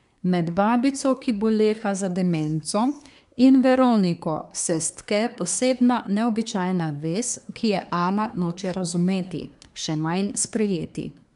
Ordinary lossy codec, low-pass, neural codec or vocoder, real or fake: none; 10.8 kHz; codec, 24 kHz, 1 kbps, SNAC; fake